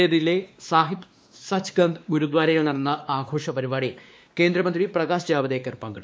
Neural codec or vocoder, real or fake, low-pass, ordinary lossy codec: codec, 16 kHz, 2 kbps, X-Codec, WavLM features, trained on Multilingual LibriSpeech; fake; none; none